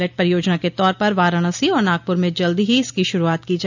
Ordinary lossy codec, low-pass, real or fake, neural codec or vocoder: none; 7.2 kHz; real; none